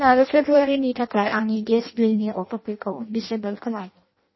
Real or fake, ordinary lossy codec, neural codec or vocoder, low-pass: fake; MP3, 24 kbps; codec, 16 kHz in and 24 kHz out, 0.6 kbps, FireRedTTS-2 codec; 7.2 kHz